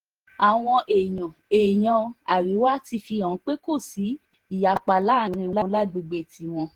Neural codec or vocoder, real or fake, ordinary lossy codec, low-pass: vocoder, 48 kHz, 128 mel bands, Vocos; fake; Opus, 16 kbps; 19.8 kHz